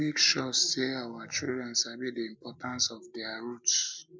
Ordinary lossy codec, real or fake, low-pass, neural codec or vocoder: none; real; none; none